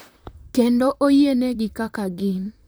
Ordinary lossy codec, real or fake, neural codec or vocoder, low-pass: none; fake; vocoder, 44.1 kHz, 128 mel bands, Pupu-Vocoder; none